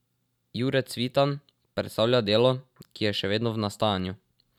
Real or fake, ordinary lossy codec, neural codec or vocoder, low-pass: fake; none; vocoder, 44.1 kHz, 128 mel bands every 512 samples, BigVGAN v2; 19.8 kHz